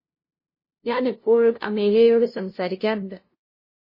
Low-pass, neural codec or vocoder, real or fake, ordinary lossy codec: 5.4 kHz; codec, 16 kHz, 0.5 kbps, FunCodec, trained on LibriTTS, 25 frames a second; fake; MP3, 24 kbps